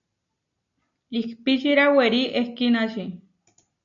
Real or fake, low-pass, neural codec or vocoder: real; 7.2 kHz; none